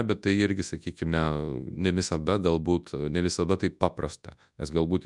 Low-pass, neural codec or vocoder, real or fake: 10.8 kHz; codec, 24 kHz, 0.9 kbps, WavTokenizer, large speech release; fake